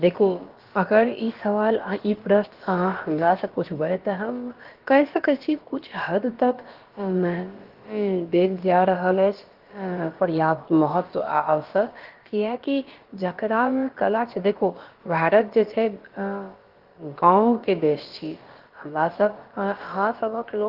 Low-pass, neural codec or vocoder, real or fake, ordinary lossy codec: 5.4 kHz; codec, 16 kHz, about 1 kbps, DyCAST, with the encoder's durations; fake; Opus, 16 kbps